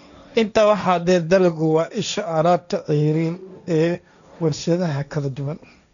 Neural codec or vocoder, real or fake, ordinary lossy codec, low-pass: codec, 16 kHz, 1.1 kbps, Voila-Tokenizer; fake; none; 7.2 kHz